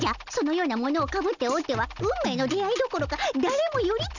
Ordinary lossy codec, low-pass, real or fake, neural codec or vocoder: none; 7.2 kHz; real; none